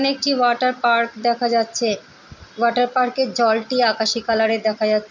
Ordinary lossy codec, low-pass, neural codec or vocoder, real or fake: none; 7.2 kHz; none; real